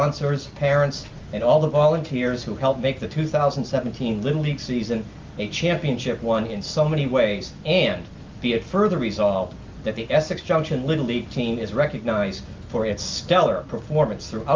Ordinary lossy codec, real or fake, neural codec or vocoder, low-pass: Opus, 24 kbps; real; none; 7.2 kHz